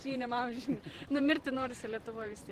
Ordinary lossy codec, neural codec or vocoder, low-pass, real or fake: Opus, 16 kbps; vocoder, 44.1 kHz, 128 mel bands every 512 samples, BigVGAN v2; 14.4 kHz; fake